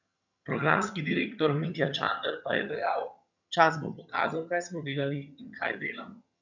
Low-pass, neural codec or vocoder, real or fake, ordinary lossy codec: 7.2 kHz; vocoder, 22.05 kHz, 80 mel bands, HiFi-GAN; fake; none